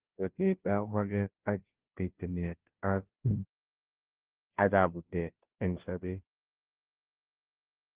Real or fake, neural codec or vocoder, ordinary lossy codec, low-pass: fake; codec, 16 kHz, 0.5 kbps, FunCodec, trained on Chinese and English, 25 frames a second; Opus, 16 kbps; 3.6 kHz